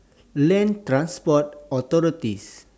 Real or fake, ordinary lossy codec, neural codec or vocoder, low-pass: real; none; none; none